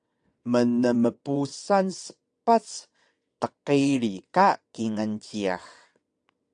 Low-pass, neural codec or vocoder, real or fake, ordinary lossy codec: 9.9 kHz; vocoder, 22.05 kHz, 80 mel bands, WaveNeXt; fake; AAC, 64 kbps